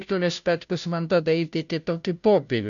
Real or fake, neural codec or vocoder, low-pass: fake; codec, 16 kHz, 0.5 kbps, FunCodec, trained on Chinese and English, 25 frames a second; 7.2 kHz